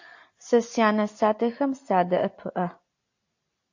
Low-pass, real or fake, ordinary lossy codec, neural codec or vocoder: 7.2 kHz; real; AAC, 48 kbps; none